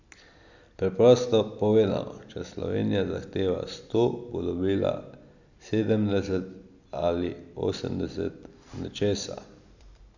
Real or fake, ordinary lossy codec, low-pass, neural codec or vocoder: real; none; 7.2 kHz; none